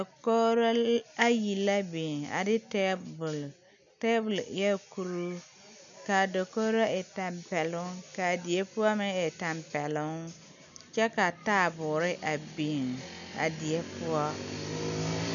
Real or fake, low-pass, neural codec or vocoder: real; 7.2 kHz; none